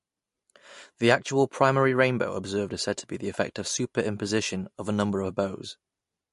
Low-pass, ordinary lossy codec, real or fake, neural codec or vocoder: 14.4 kHz; MP3, 48 kbps; real; none